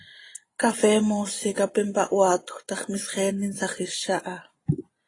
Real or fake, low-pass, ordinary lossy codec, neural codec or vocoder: real; 10.8 kHz; AAC, 32 kbps; none